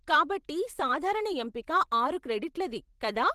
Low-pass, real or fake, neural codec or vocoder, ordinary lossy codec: 14.4 kHz; fake; vocoder, 44.1 kHz, 128 mel bands every 512 samples, BigVGAN v2; Opus, 16 kbps